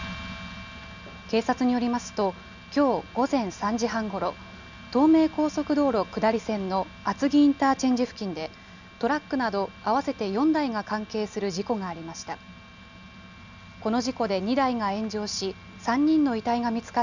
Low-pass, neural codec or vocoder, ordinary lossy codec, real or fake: 7.2 kHz; none; none; real